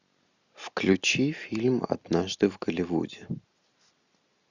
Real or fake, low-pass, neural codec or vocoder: real; 7.2 kHz; none